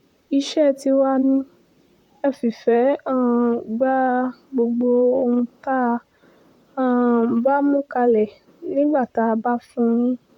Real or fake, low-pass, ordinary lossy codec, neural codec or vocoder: fake; 19.8 kHz; none; vocoder, 44.1 kHz, 128 mel bands, Pupu-Vocoder